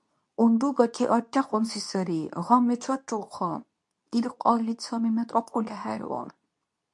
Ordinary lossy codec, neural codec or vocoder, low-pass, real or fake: MP3, 64 kbps; codec, 24 kHz, 0.9 kbps, WavTokenizer, medium speech release version 2; 10.8 kHz; fake